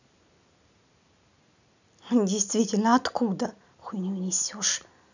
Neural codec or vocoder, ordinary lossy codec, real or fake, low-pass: none; none; real; 7.2 kHz